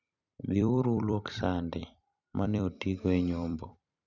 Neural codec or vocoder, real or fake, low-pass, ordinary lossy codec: vocoder, 44.1 kHz, 128 mel bands every 256 samples, BigVGAN v2; fake; 7.2 kHz; none